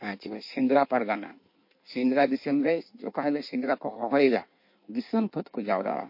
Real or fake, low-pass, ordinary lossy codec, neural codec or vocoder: fake; 5.4 kHz; MP3, 32 kbps; codec, 16 kHz in and 24 kHz out, 1.1 kbps, FireRedTTS-2 codec